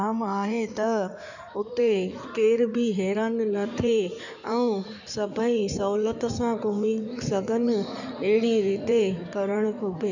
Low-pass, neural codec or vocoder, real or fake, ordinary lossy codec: 7.2 kHz; codec, 16 kHz in and 24 kHz out, 2.2 kbps, FireRedTTS-2 codec; fake; none